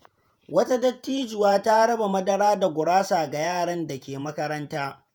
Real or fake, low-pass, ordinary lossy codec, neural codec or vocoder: fake; none; none; vocoder, 48 kHz, 128 mel bands, Vocos